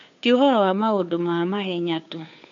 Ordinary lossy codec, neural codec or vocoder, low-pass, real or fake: AAC, 64 kbps; codec, 16 kHz, 2 kbps, FunCodec, trained on Chinese and English, 25 frames a second; 7.2 kHz; fake